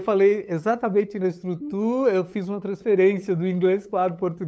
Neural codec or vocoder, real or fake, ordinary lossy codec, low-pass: codec, 16 kHz, 8 kbps, FunCodec, trained on LibriTTS, 25 frames a second; fake; none; none